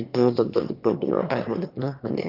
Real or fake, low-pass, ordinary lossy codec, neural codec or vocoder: fake; 5.4 kHz; Opus, 24 kbps; autoencoder, 22.05 kHz, a latent of 192 numbers a frame, VITS, trained on one speaker